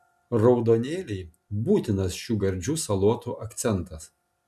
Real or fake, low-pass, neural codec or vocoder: real; 14.4 kHz; none